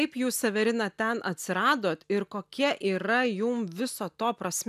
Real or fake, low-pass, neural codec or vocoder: real; 14.4 kHz; none